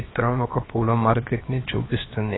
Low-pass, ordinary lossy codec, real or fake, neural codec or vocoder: 7.2 kHz; AAC, 16 kbps; fake; codec, 16 kHz, about 1 kbps, DyCAST, with the encoder's durations